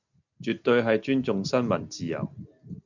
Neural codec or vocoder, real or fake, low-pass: none; real; 7.2 kHz